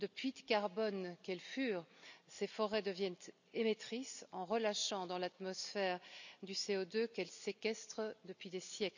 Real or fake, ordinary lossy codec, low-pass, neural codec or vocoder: real; none; 7.2 kHz; none